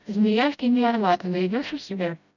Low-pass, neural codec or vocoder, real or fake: 7.2 kHz; codec, 16 kHz, 0.5 kbps, FreqCodec, smaller model; fake